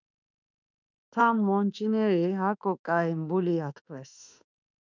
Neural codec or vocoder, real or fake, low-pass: autoencoder, 48 kHz, 32 numbers a frame, DAC-VAE, trained on Japanese speech; fake; 7.2 kHz